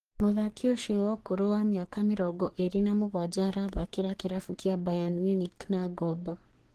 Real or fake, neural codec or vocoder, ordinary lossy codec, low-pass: fake; codec, 44.1 kHz, 3.4 kbps, Pupu-Codec; Opus, 16 kbps; 14.4 kHz